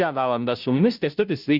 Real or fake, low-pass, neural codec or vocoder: fake; 5.4 kHz; codec, 16 kHz, 0.5 kbps, FunCodec, trained on Chinese and English, 25 frames a second